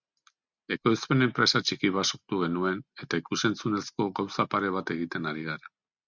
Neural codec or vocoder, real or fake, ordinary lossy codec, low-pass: none; real; Opus, 64 kbps; 7.2 kHz